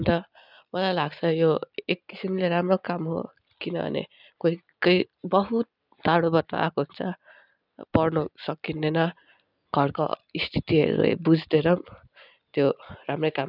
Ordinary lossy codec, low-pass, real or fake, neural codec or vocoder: none; 5.4 kHz; real; none